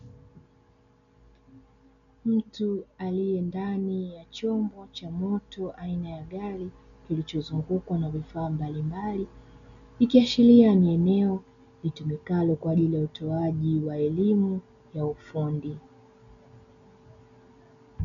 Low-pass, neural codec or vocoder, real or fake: 7.2 kHz; none; real